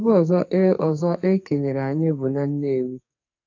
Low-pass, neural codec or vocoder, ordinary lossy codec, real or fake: 7.2 kHz; codec, 44.1 kHz, 2.6 kbps, SNAC; AAC, 48 kbps; fake